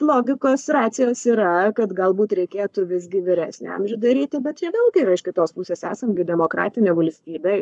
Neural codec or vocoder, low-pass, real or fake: codec, 44.1 kHz, 7.8 kbps, Pupu-Codec; 10.8 kHz; fake